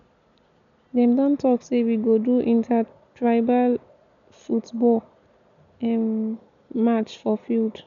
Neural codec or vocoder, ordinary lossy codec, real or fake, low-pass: none; none; real; 7.2 kHz